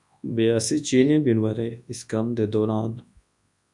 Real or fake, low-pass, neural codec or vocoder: fake; 10.8 kHz; codec, 24 kHz, 0.9 kbps, WavTokenizer, large speech release